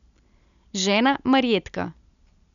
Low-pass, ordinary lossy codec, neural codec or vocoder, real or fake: 7.2 kHz; none; none; real